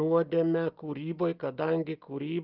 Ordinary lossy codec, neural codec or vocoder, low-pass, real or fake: Opus, 24 kbps; none; 5.4 kHz; real